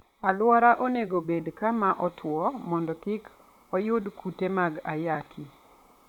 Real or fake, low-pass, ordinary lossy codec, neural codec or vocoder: fake; 19.8 kHz; none; vocoder, 44.1 kHz, 128 mel bands, Pupu-Vocoder